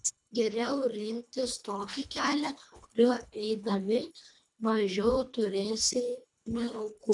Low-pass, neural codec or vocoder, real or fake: 10.8 kHz; codec, 24 kHz, 1.5 kbps, HILCodec; fake